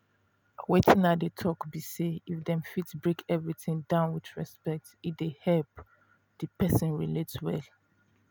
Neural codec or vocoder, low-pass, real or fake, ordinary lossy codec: none; none; real; none